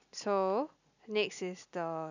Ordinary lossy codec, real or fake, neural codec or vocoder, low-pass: none; real; none; 7.2 kHz